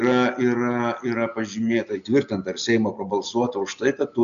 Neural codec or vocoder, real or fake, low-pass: none; real; 7.2 kHz